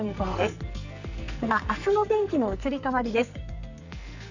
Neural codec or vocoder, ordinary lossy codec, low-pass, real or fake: codec, 44.1 kHz, 2.6 kbps, SNAC; none; 7.2 kHz; fake